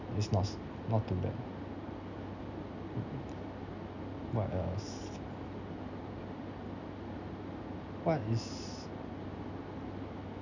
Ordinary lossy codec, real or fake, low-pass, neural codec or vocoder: none; real; 7.2 kHz; none